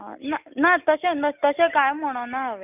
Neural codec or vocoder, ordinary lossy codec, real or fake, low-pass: none; none; real; 3.6 kHz